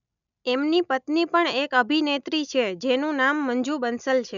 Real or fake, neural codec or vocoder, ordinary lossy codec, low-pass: real; none; none; 7.2 kHz